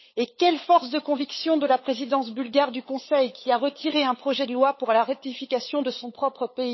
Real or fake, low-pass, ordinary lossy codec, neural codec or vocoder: fake; 7.2 kHz; MP3, 24 kbps; codec, 16 kHz, 8 kbps, FunCodec, trained on LibriTTS, 25 frames a second